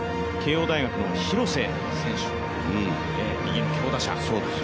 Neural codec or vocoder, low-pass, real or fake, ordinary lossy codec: none; none; real; none